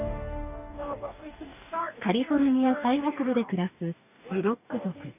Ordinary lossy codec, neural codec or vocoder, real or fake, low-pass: none; codec, 44.1 kHz, 2.6 kbps, DAC; fake; 3.6 kHz